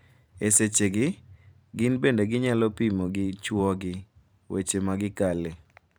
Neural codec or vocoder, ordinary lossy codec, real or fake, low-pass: vocoder, 44.1 kHz, 128 mel bands every 512 samples, BigVGAN v2; none; fake; none